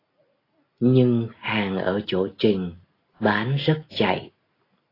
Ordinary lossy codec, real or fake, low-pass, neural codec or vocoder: AAC, 24 kbps; real; 5.4 kHz; none